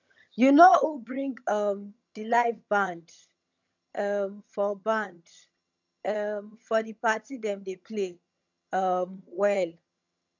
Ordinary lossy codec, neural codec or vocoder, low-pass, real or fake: none; vocoder, 22.05 kHz, 80 mel bands, HiFi-GAN; 7.2 kHz; fake